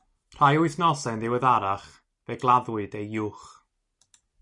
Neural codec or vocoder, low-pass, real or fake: none; 10.8 kHz; real